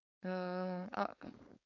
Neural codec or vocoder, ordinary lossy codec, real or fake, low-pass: codec, 16 kHz, 4.8 kbps, FACodec; none; fake; 7.2 kHz